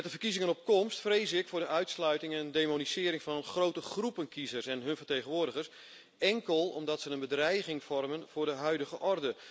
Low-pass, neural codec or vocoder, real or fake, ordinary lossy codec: none; none; real; none